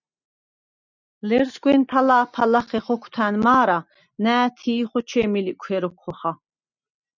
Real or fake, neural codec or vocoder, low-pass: real; none; 7.2 kHz